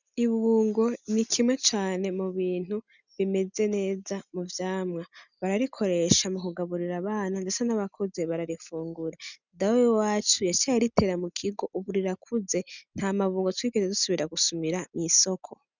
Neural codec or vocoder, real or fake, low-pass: none; real; 7.2 kHz